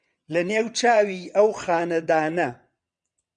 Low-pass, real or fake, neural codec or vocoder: 9.9 kHz; fake; vocoder, 22.05 kHz, 80 mel bands, WaveNeXt